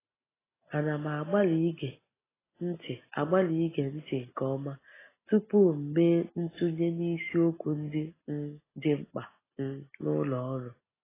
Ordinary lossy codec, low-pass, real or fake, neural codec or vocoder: AAC, 16 kbps; 3.6 kHz; real; none